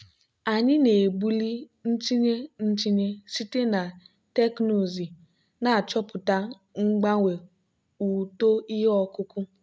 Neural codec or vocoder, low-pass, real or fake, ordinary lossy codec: none; none; real; none